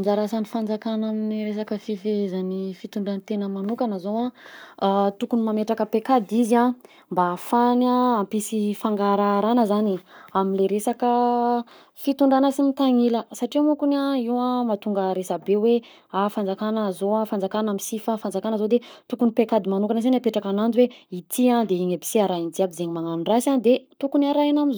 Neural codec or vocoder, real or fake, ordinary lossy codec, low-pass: codec, 44.1 kHz, 7.8 kbps, Pupu-Codec; fake; none; none